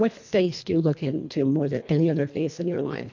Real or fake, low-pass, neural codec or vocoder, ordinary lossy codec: fake; 7.2 kHz; codec, 24 kHz, 1.5 kbps, HILCodec; MP3, 64 kbps